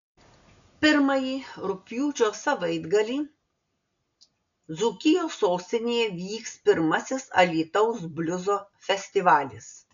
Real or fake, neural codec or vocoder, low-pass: real; none; 7.2 kHz